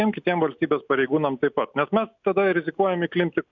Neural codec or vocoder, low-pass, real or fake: none; 7.2 kHz; real